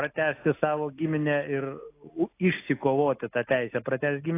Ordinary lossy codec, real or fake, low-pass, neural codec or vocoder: AAC, 24 kbps; real; 3.6 kHz; none